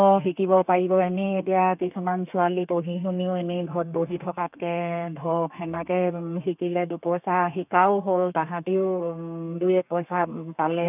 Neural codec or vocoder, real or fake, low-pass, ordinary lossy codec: codec, 32 kHz, 1.9 kbps, SNAC; fake; 3.6 kHz; none